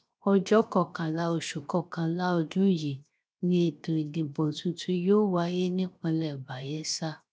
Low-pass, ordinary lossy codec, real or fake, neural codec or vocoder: none; none; fake; codec, 16 kHz, 0.7 kbps, FocalCodec